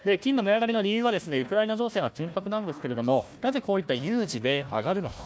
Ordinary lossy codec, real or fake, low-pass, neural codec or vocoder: none; fake; none; codec, 16 kHz, 1 kbps, FunCodec, trained on Chinese and English, 50 frames a second